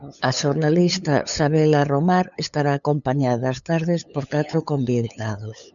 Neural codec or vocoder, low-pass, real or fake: codec, 16 kHz, 8 kbps, FunCodec, trained on LibriTTS, 25 frames a second; 7.2 kHz; fake